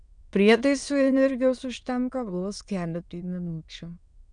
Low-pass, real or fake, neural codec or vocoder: 9.9 kHz; fake; autoencoder, 22.05 kHz, a latent of 192 numbers a frame, VITS, trained on many speakers